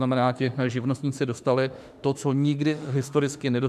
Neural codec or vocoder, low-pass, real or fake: autoencoder, 48 kHz, 32 numbers a frame, DAC-VAE, trained on Japanese speech; 14.4 kHz; fake